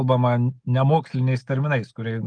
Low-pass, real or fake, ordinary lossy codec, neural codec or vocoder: 9.9 kHz; real; Opus, 24 kbps; none